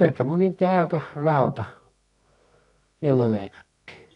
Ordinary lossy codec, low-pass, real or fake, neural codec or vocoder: none; 10.8 kHz; fake; codec, 24 kHz, 0.9 kbps, WavTokenizer, medium music audio release